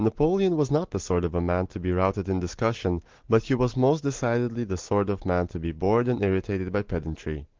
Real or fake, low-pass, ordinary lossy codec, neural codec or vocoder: real; 7.2 kHz; Opus, 16 kbps; none